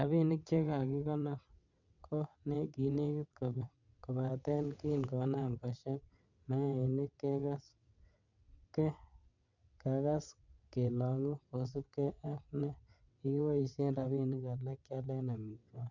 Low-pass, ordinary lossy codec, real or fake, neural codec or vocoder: 7.2 kHz; none; fake; vocoder, 22.05 kHz, 80 mel bands, WaveNeXt